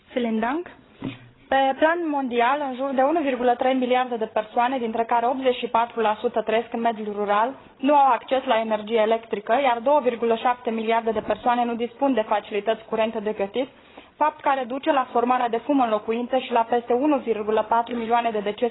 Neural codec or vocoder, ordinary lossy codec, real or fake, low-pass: codec, 16 kHz, 16 kbps, FreqCodec, larger model; AAC, 16 kbps; fake; 7.2 kHz